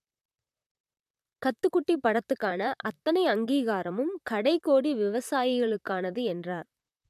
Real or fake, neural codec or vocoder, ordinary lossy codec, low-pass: fake; vocoder, 44.1 kHz, 128 mel bands, Pupu-Vocoder; none; 14.4 kHz